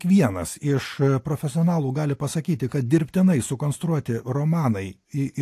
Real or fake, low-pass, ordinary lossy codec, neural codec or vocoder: real; 14.4 kHz; AAC, 64 kbps; none